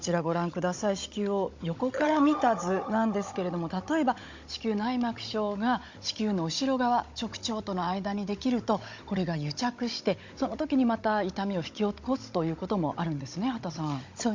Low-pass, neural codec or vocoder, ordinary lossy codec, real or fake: 7.2 kHz; codec, 16 kHz, 16 kbps, FunCodec, trained on Chinese and English, 50 frames a second; MP3, 48 kbps; fake